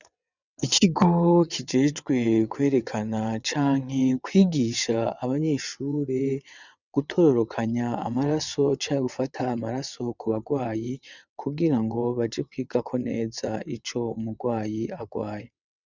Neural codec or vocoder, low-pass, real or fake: vocoder, 22.05 kHz, 80 mel bands, WaveNeXt; 7.2 kHz; fake